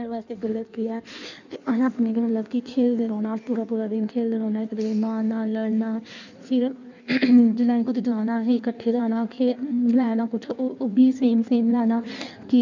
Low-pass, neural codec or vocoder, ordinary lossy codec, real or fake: 7.2 kHz; codec, 16 kHz in and 24 kHz out, 1.1 kbps, FireRedTTS-2 codec; none; fake